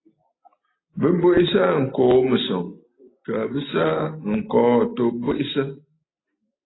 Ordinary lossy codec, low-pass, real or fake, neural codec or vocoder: AAC, 16 kbps; 7.2 kHz; real; none